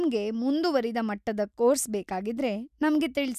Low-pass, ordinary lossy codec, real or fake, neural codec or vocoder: 14.4 kHz; none; real; none